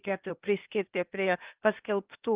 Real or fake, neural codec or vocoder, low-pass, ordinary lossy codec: fake; codec, 16 kHz, 0.8 kbps, ZipCodec; 3.6 kHz; Opus, 24 kbps